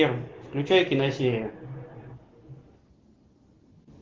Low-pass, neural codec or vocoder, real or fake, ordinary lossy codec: 7.2 kHz; none; real; Opus, 16 kbps